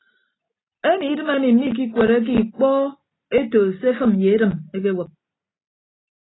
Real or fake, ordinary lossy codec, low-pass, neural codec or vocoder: real; AAC, 16 kbps; 7.2 kHz; none